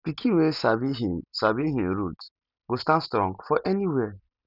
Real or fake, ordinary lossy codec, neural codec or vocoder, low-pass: real; none; none; 5.4 kHz